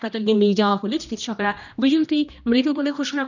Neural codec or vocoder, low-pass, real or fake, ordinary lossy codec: codec, 16 kHz, 1 kbps, X-Codec, HuBERT features, trained on general audio; 7.2 kHz; fake; none